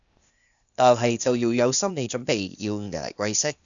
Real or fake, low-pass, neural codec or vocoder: fake; 7.2 kHz; codec, 16 kHz, 0.8 kbps, ZipCodec